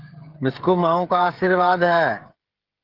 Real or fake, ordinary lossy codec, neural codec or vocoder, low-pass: fake; Opus, 16 kbps; codec, 16 kHz, 16 kbps, FreqCodec, smaller model; 5.4 kHz